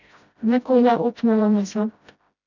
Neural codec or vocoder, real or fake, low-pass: codec, 16 kHz, 0.5 kbps, FreqCodec, smaller model; fake; 7.2 kHz